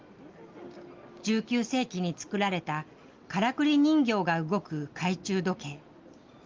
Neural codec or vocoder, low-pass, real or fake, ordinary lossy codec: none; 7.2 kHz; real; Opus, 32 kbps